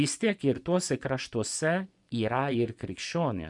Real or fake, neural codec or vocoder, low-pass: fake; vocoder, 24 kHz, 100 mel bands, Vocos; 10.8 kHz